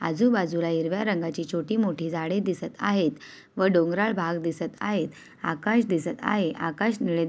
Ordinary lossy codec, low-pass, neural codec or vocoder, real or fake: none; none; none; real